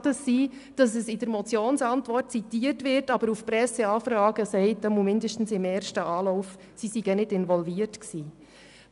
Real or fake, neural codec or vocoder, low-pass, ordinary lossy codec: real; none; 10.8 kHz; none